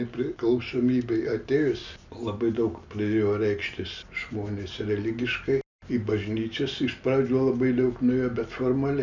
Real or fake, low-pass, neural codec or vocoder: real; 7.2 kHz; none